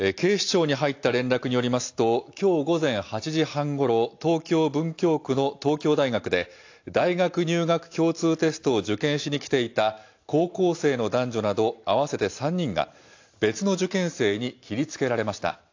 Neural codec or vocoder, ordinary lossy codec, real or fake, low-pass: none; AAC, 48 kbps; real; 7.2 kHz